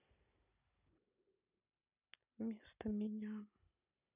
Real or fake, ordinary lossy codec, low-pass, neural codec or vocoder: real; none; 3.6 kHz; none